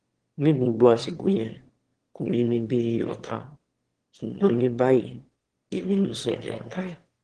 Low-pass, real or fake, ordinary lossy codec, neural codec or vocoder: 9.9 kHz; fake; Opus, 16 kbps; autoencoder, 22.05 kHz, a latent of 192 numbers a frame, VITS, trained on one speaker